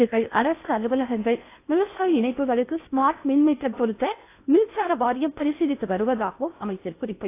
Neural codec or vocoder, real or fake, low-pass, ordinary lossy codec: codec, 16 kHz in and 24 kHz out, 0.6 kbps, FocalCodec, streaming, 4096 codes; fake; 3.6 kHz; AAC, 24 kbps